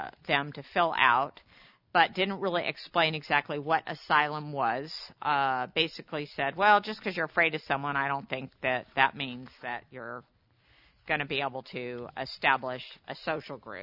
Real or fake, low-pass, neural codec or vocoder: real; 5.4 kHz; none